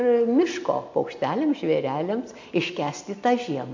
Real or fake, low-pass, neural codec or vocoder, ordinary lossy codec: real; 7.2 kHz; none; MP3, 48 kbps